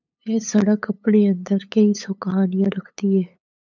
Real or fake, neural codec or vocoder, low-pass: fake; codec, 16 kHz, 8 kbps, FunCodec, trained on LibriTTS, 25 frames a second; 7.2 kHz